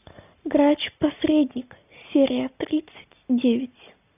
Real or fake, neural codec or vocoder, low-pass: real; none; 3.6 kHz